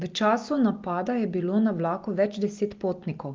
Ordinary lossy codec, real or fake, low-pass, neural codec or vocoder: Opus, 32 kbps; real; 7.2 kHz; none